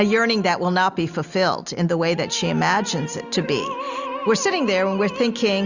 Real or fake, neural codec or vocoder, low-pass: real; none; 7.2 kHz